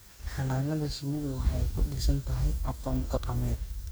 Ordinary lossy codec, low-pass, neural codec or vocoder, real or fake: none; none; codec, 44.1 kHz, 2.6 kbps, DAC; fake